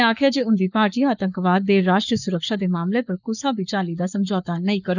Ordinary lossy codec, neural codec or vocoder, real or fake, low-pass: none; codec, 44.1 kHz, 7.8 kbps, Pupu-Codec; fake; 7.2 kHz